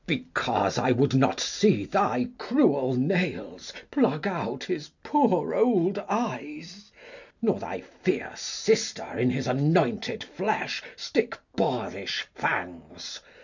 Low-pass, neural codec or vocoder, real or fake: 7.2 kHz; none; real